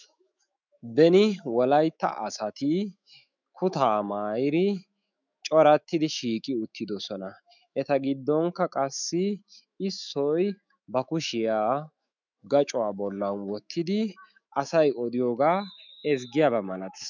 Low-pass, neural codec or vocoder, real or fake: 7.2 kHz; autoencoder, 48 kHz, 128 numbers a frame, DAC-VAE, trained on Japanese speech; fake